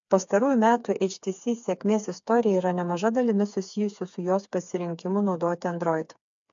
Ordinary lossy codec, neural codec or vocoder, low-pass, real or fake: AAC, 64 kbps; codec, 16 kHz, 4 kbps, FreqCodec, smaller model; 7.2 kHz; fake